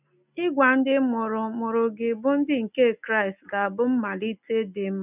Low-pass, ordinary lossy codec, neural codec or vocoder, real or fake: 3.6 kHz; none; none; real